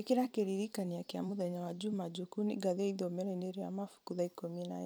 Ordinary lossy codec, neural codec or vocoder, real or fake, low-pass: none; vocoder, 44.1 kHz, 128 mel bands every 256 samples, BigVGAN v2; fake; none